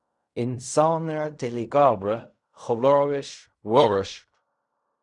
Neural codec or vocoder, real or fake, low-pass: codec, 16 kHz in and 24 kHz out, 0.4 kbps, LongCat-Audio-Codec, fine tuned four codebook decoder; fake; 10.8 kHz